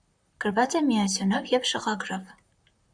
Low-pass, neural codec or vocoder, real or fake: 9.9 kHz; vocoder, 44.1 kHz, 128 mel bands, Pupu-Vocoder; fake